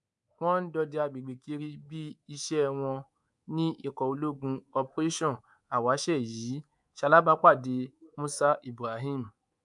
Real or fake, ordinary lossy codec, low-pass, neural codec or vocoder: fake; MP3, 96 kbps; 10.8 kHz; codec, 24 kHz, 3.1 kbps, DualCodec